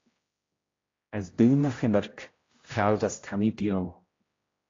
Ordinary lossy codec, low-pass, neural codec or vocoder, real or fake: AAC, 32 kbps; 7.2 kHz; codec, 16 kHz, 0.5 kbps, X-Codec, HuBERT features, trained on general audio; fake